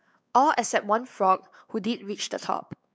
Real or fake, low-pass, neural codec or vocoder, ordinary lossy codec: fake; none; codec, 16 kHz, 4 kbps, X-Codec, WavLM features, trained on Multilingual LibriSpeech; none